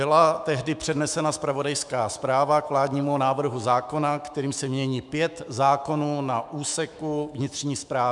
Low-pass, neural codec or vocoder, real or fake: 10.8 kHz; autoencoder, 48 kHz, 128 numbers a frame, DAC-VAE, trained on Japanese speech; fake